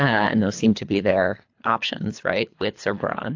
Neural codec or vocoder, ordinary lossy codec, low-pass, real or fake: codec, 24 kHz, 3 kbps, HILCodec; AAC, 48 kbps; 7.2 kHz; fake